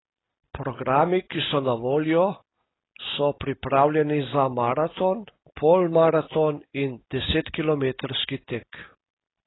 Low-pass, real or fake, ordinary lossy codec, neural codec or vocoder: 7.2 kHz; real; AAC, 16 kbps; none